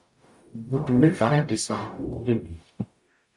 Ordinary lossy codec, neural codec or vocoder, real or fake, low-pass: AAC, 64 kbps; codec, 44.1 kHz, 0.9 kbps, DAC; fake; 10.8 kHz